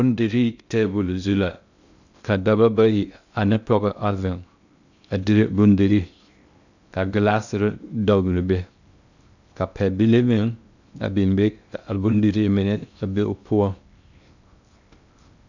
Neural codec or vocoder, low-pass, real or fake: codec, 16 kHz in and 24 kHz out, 0.6 kbps, FocalCodec, streaming, 2048 codes; 7.2 kHz; fake